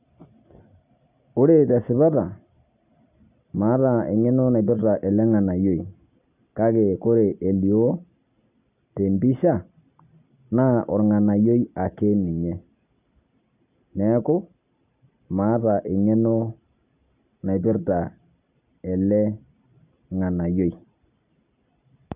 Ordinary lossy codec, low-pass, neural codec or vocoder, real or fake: none; 3.6 kHz; none; real